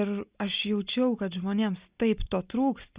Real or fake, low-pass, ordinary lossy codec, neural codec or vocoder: real; 3.6 kHz; Opus, 64 kbps; none